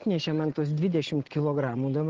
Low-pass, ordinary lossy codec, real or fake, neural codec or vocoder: 7.2 kHz; Opus, 16 kbps; real; none